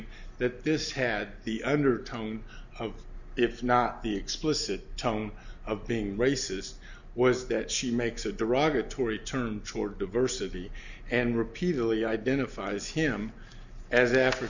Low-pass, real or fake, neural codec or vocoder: 7.2 kHz; real; none